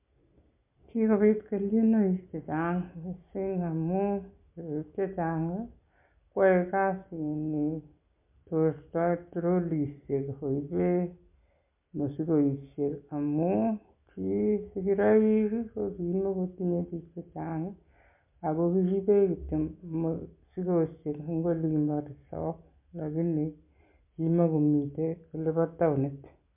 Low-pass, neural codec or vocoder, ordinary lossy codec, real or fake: 3.6 kHz; none; MP3, 32 kbps; real